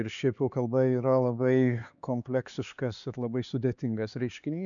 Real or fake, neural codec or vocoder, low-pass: fake; codec, 16 kHz, 4 kbps, X-Codec, HuBERT features, trained on LibriSpeech; 7.2 kHz